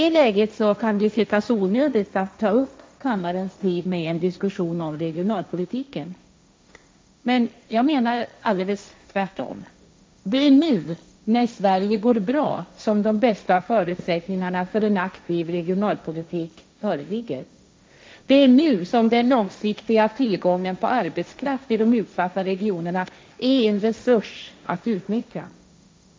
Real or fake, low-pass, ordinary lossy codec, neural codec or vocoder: fake; none; none; codec, 16 kHz, 1.1 kbps, Voila-Tokenizer